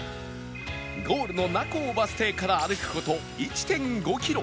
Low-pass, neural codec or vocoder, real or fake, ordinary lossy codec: none; none; real; none